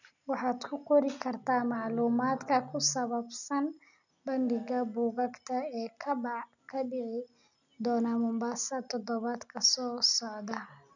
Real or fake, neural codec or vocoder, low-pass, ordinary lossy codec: real; none; 7.2 kHz; none